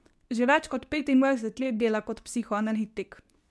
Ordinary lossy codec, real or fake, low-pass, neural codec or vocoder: none; fake; none; codec, 24 kHz, 0.9 kbps, WavTokenizer, medium speech release version 2